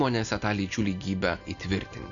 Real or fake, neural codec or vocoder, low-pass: real; none; 7.2 kHz